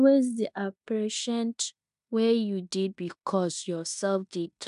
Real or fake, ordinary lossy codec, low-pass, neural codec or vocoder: fake; MP3, 96 kbps; 10.8 kHz; codec, 16 kHz in and 24 kHz out, 0.9 kbps, LongCat-Audio-Codec, fine tuned four codebook decoder